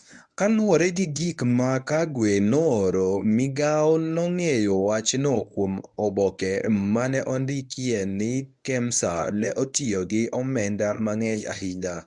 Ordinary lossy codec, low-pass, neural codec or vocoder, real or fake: none; 10.8 kHz; codec, 24 kHz, 0.9 kbps, WavTokenizer, medium speech release version 1; fake